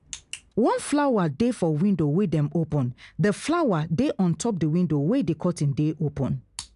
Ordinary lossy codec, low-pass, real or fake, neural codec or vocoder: none; 10.8 kHz; real; none